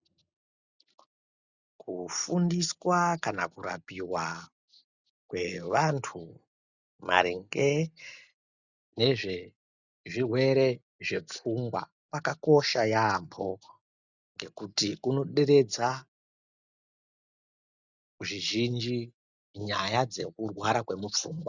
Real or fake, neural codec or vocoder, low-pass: real; none; 7.2 kHz